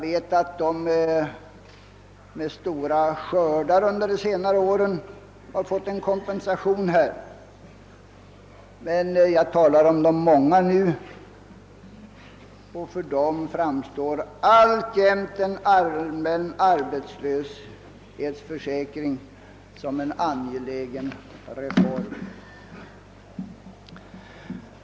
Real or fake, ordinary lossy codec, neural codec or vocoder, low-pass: real; none; none; none